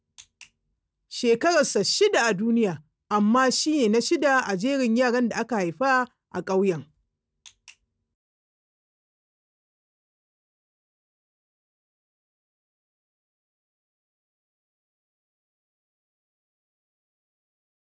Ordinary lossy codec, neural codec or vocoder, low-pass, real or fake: none; none; none; real